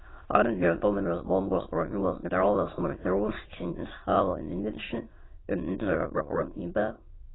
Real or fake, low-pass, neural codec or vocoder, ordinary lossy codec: fake; 7.2 kHz; autoencoder, 22.05 kHz, a latent of 192 numbers a frame, VITS, trained on many speakers; AAC, 16 kbps